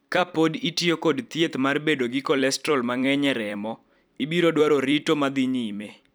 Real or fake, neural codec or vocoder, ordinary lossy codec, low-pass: fake; vocoder, 44.1 kHz, 128 mel bands every 256 samples, BigVGAN v2; none; none